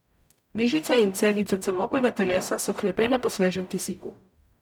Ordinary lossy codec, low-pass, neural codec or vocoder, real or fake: none; 19.8 kHz; codec, 44.1 kHz, 0.9 kbps, DAC; fake